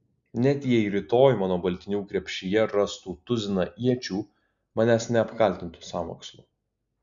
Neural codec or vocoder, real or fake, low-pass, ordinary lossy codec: none; real; 7.2 kHz; Opus, 64 kbps